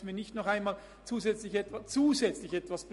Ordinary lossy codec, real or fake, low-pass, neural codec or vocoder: MP3, 48 kbps; real; 14.4 kHz; none